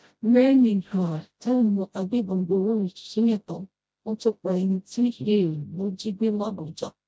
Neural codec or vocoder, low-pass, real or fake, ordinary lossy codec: codec, 16 kHz, 0.5 kbps, FreqCodec, smaller model; none; fake; none